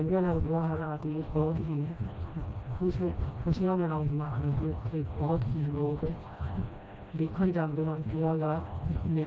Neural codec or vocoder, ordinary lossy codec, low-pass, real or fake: codec, 16 kHz, 1 kbps, FreqCodec, smaller model; none; none; fake